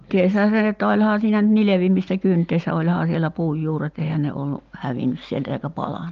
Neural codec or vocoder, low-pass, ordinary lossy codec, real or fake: none; 7.2 kHz; Opus, 24 kbps; real